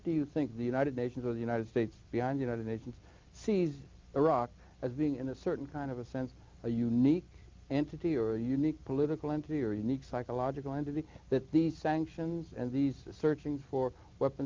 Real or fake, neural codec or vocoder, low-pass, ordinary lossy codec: real; none; 7.2 kHz; Opus, 24 kbps